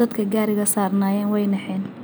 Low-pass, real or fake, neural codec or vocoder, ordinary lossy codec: none; real; none; none